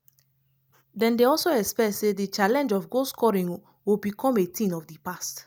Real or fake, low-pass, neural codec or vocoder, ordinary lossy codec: real; 19.8 kHz; none; none